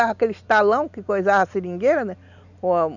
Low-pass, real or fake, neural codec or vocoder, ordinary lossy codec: 7.2 kHz; real; none; none